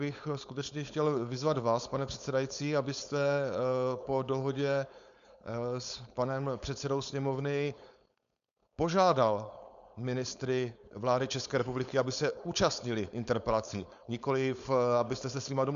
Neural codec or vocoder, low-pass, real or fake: codec, 16 kHz, 4.8 kbps, FACodec; 7.2 kHz; fake